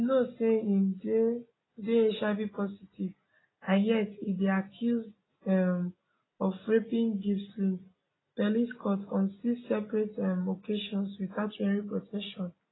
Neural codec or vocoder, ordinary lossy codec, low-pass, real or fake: none; AAC, 16 kbps; 7.2 kHz; real